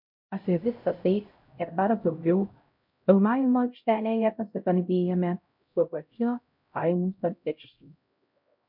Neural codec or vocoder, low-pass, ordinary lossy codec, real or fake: codec, 16 kHz, 0.5 kbps, X-Codec, HuBERT features, trained on LibriSpeech; 5.4 kHz; MP3, 48 kbps; fake